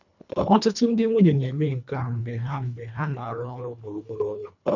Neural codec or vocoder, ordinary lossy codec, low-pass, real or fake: codec, 24 kHz, 1.5 kbps, HILCodec; none; 7.2 kHz; fake